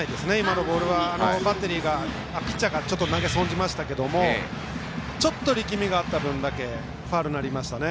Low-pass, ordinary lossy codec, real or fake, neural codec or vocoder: none; none; real; none